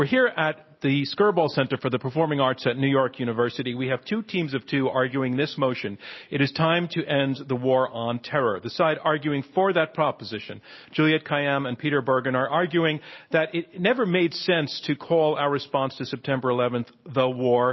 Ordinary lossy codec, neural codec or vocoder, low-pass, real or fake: MP3, 24 kbps; none; 7.2 kHz; real